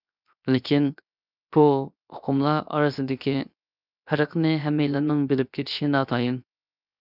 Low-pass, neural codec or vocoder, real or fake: 5.4 kHz; codec, 16 kHz, 0.7 kbps, FocalCodec; fake